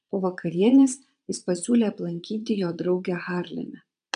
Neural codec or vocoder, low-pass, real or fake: vocoder, 22.05 kHz, 80 mel bands, Vocos; 9.9 kHz; fake